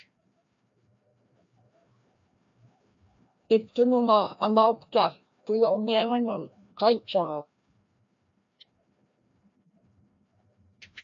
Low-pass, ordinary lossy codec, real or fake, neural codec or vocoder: 7.2 kHz; AAC, 64 kbps; fake; codec, 16 kHz, 1 kbps, FreqCodec, larger model